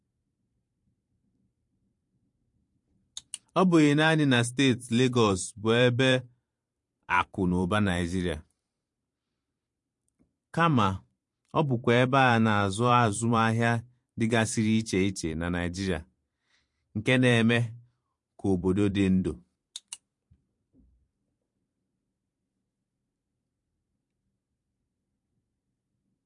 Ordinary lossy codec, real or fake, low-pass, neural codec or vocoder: MP3, 48 kbps; fake; 10.8 kHz; vocoder, 44.1 kHz, 128 mel bands every 512 samples, BigVGAN v2